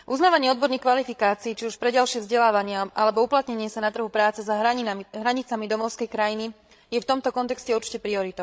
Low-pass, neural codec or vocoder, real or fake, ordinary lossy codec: none; codec, 16 kHz, 16 kbps, FreqCodec, larger model; fake; none